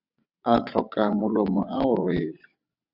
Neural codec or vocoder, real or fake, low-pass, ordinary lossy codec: vocoder, 22.05 kHz, 80 mel bands, WaveNeXt; fake; 5.4 kHz; Opus, 64 kbps